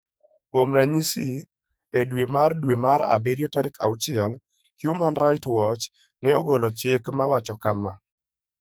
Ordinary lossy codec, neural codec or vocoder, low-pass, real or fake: none; codec, 44.1 kHz, 2.6 kbps, SNAC; none; fake